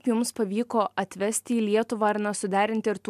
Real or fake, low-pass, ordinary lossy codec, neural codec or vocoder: real; 14.4 kHz; MP3, 96 kbps; none